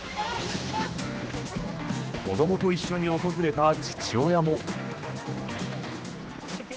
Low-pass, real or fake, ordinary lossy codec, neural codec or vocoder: none; fake; none; codec, 16 kHz, 2 kbps, X-Codec, HuBERT features, trained on general audio